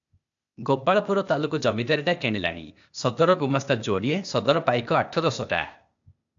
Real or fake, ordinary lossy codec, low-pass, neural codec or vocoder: fake; AAC, 64 kbps; 7.2 kHz; codec, 16 kHz, 0.8 kbps, ZipCodec